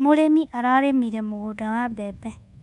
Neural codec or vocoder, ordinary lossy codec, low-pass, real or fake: codec, 24 kHz, 1.2 kbps, DualCodec; none; 10.8 kHz; fake